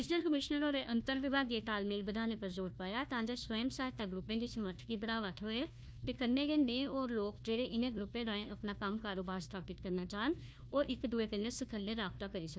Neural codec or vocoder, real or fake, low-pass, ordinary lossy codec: codec, 16 kHz, 1 kbps, FunCodec, trained on Chinese and English, 50 frames a second; fake; none; none